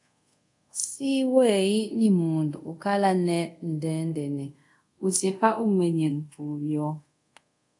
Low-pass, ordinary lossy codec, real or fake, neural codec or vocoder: 10.8 kHz; AAC, 64 kbps; fake; codec, 24 kHz, 0.5 kbps, DualCodec